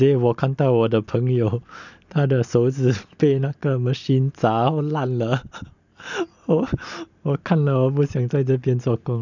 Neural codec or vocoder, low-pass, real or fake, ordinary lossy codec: none; 7.2 kHz; real; none